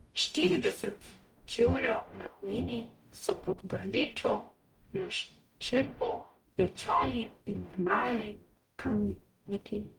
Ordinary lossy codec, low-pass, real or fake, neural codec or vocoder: Opus, 32 kbps; 19.8 kHz; fake; codec, 44.1 kHz, 0.9 kbps, DAC